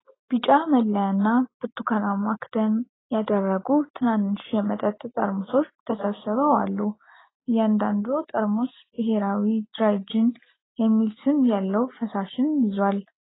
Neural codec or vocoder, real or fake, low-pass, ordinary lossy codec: none; real; 7.2 kHz; AAC, 16 kbps